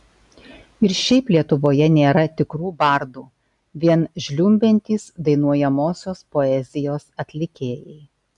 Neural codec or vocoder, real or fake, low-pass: none; real; 10.8 kHz